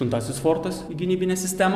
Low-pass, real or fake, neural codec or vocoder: 14.4 kHz; real; none